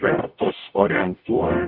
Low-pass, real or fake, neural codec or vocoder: 5.4 kHz; fake; codec, 44.1 kHz, 0.9 kbps, DAC